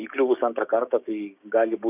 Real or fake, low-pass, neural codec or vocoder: fake; 3.6 kHz; codec, 16 kHz, 6 kbps, DAC